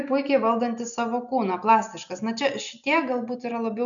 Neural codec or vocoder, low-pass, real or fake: none; 7.2 kHz; real